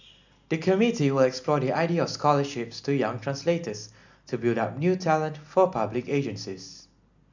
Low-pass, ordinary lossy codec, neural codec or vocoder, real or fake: 7.2 kHz; none; none; real